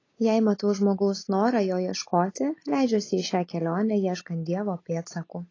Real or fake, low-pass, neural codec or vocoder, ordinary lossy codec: real; 7.2 kHz; none; AAC, 32 kbps